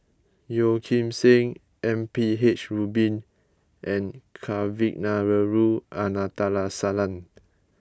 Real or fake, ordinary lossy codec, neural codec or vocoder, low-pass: real; none; none; none